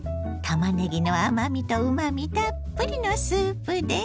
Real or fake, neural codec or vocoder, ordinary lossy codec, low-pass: real; none; none; none